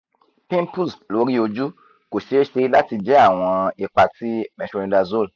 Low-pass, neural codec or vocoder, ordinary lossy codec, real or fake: 7.2 kHz; none; none; real